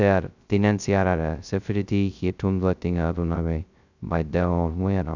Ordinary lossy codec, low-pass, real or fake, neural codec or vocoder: none; 7.2 kHz; fake; codec, 16 kHz, 0.2 kbps, FocalCodec